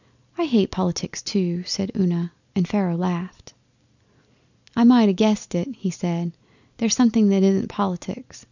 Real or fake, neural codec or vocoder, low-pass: real; none; 7.2 kHz